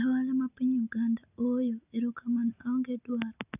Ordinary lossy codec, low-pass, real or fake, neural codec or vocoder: none; 3.6 kHz; real; none